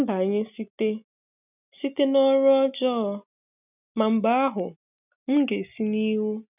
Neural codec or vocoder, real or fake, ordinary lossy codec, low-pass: none; real; none; 3.6 kHz